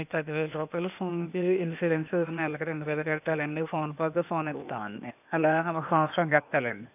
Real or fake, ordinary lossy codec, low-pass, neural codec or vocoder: fake; none; 3.6 kHz; codec, 16 kHz, 0.8 kbps, ZipCodec